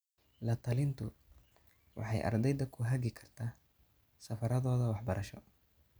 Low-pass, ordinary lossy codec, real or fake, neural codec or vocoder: none; none; real; none